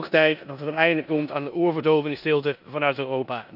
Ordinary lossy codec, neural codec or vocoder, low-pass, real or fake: none; codec, 16 kHz in and 24 kHz out, 0.9 kbps, LongCat-Audio-Codec, four codebook decoder; 5.4 kHz; fake